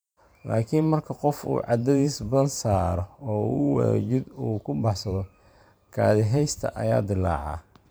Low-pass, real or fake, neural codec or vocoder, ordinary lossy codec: none; fake; vocoder, 44.1 kHz, 128 mel bands every 512 samples, BigVGAN v2; none